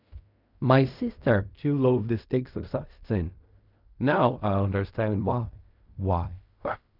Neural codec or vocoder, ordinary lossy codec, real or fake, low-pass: codec, 16 kHz in and 24 kHz out, 0.4 kbps, LongCat-Audio-Codec, fine tuned four codebook decoder; none; fake; 5.4 kHz